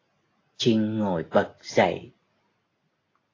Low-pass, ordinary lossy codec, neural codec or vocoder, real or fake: 7.2 kHz; AAC, 32 kbps; none; real